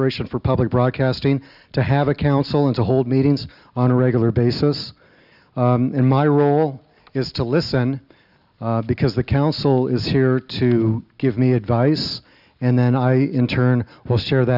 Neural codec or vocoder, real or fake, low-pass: none; real; 5.4 kHz